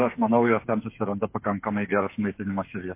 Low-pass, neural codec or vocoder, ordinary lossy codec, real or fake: 3.6 kHz; codec, 16 kHz, 8 kbps, FreqCodec, smaller model; MP3, 24 kbps; fake